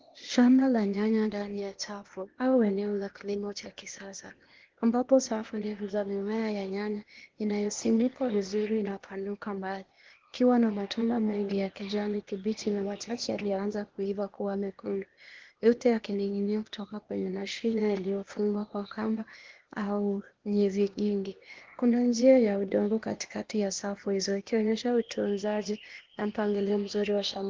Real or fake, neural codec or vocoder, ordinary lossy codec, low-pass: fake; codec, 16 kHz, 0.8 kbps, ZipCodec; Opus, 16 kbps; 7.2 kHz